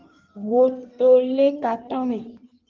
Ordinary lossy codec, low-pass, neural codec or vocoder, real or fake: Opus, 32 kbps; 7.2 kHz; codec, 16 kHz in and 24 kHz out, 1.1 kbps, FireRedTTS-2 codec; fake